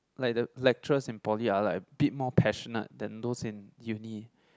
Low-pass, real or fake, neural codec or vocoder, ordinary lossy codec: none; real; none; none